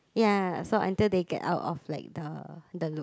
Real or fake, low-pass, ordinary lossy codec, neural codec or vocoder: real; none; none; none